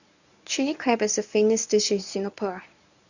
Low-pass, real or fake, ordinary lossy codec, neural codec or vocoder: 7.2 kHz; fake; none; codec, 24 kHz, 0.9 kbps, WavTokenizer, medium speech release version 1